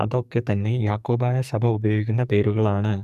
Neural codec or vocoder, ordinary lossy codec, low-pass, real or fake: codec, 44.1 kHz, 2.6 kbps, SNAC; none; 14.4 kHz; fake